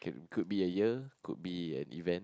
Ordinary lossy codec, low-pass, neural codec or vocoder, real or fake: none; none; none; real